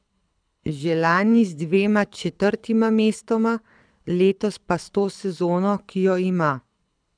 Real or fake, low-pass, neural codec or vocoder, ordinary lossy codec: fake; 9.9 kHz; codec, 24 kHz, 6 kbps, HILCodec; none